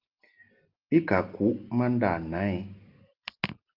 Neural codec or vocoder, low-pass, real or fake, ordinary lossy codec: none; 5.4 kHz; real; Opus, 24 kbps